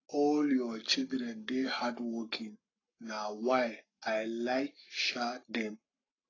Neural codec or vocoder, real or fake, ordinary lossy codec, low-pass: codec, 44.1 kHz, 7.8 kbps, Pupu-Codec; fake; AAC, 32 kbps; 7.2 kHz